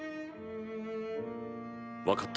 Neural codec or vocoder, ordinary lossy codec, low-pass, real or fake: none; none; none; real